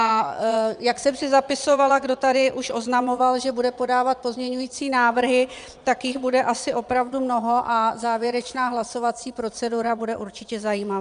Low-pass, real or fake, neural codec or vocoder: 9.9 kHz; fake; vocoder, 22.05 kHz, 80 mel bands, Vocos